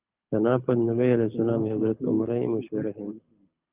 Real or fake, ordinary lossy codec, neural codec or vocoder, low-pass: fake; Opus, 24 kbps; codec, 24 kHz, 6 kbps, HILCodec; 3.6 kHz